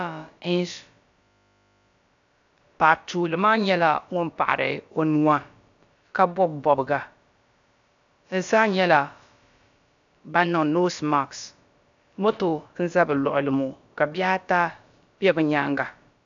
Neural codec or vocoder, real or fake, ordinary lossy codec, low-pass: codec, 16 kHz, about 1 kbps, DyCAST, with the encoder's durations; fake; AAC, 64 kbps; 7.2 kHz